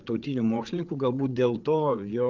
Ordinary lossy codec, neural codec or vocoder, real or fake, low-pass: Opus, 32 kbps; codec, 16 kHz, 8 kbps, FreqCodec, larger model; fake; 7.2 kHz